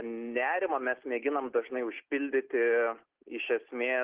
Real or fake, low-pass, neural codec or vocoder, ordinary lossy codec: real; 3.6 kHz; none; Opus, 16 kbps